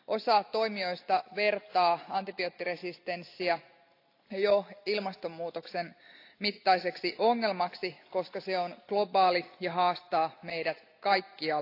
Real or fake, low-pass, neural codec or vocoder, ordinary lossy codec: real; 5.4 kHz; none; AAC, 32 kbps